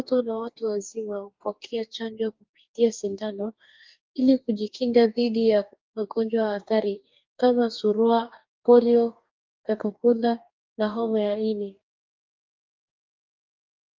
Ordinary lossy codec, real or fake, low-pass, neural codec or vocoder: Opus, 24 kbps; fake; 7.2 kHz; codec, 44.1 kHz, 2.6 kbps, DAC